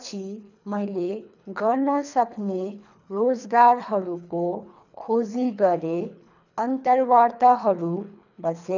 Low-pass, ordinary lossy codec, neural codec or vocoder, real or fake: 7.2 kHz; none; codec, 24 kHz, 3 kbps, HILCodec; fake